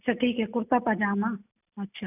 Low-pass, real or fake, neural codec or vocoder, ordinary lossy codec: 3.6 kHz; real; none; none